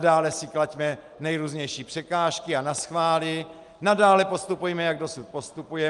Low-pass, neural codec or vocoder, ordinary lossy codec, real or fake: 10.8 kHz; none; Opus, 32 kbps; real